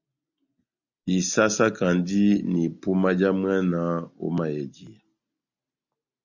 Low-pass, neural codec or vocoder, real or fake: 7.2 kHz; none; real